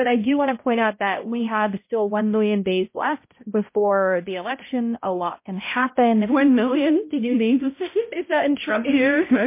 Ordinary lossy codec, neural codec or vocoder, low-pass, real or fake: MP3, 24 kbps; codec, 16 kHz, 0.5 kbps, X-Codec, HuBERT features, trained on balanced general audio; 3.6 kHz; fake